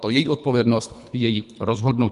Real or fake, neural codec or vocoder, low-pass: fake; codec, 24 kHz, 3 kbps, HILCodec; 10.8 kHz